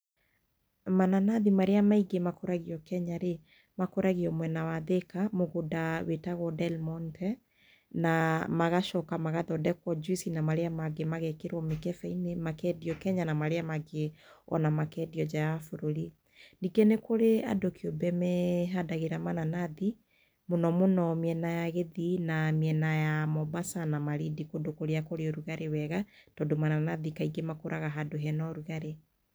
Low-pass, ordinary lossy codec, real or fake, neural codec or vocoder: none; none; real; none